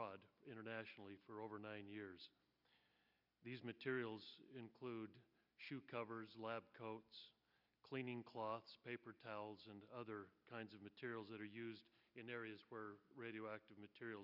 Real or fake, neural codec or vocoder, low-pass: real; none; 5.4 kHz